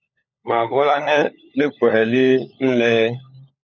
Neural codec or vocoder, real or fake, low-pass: codec, 16 kHz, 16 kbps, FunCodec, trained on LibriTTS, 50 frames a second; fake; 7.2 kHz